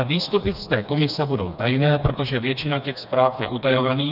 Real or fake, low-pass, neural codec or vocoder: fake; 5.4 kHz; codec, 16 kHz, 2 kbps, FreqCodec, smaller model